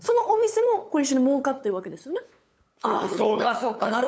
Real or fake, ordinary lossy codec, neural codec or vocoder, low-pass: fake; none; codec, 16 kHz, 8 kbps, FunCodec, trained on LibriTTS, 25 frames a second; none